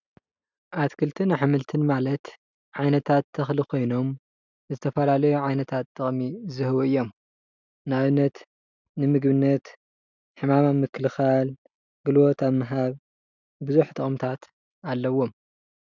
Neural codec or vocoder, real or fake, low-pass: none; real; 7.2 kHz